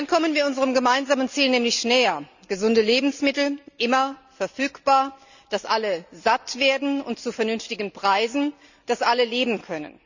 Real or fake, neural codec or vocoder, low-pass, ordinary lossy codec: real; none; 7.2 kHz; none